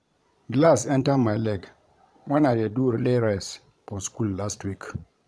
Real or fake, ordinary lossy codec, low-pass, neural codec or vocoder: fake; none; none; vocoder, 22.05 kHz, 80 mel bands, Vocos